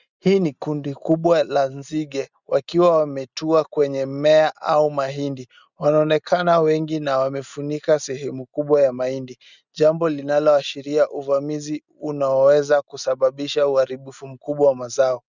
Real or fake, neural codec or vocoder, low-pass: real; none; 7.2 kHz